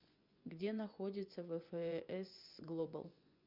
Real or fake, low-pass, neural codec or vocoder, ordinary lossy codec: fake; 5.4 kHz; vocoder, 24 kHz, 100 mel bands, Vocos; MP3, 48 kbps